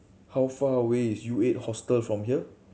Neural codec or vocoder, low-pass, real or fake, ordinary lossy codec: none; none; real; none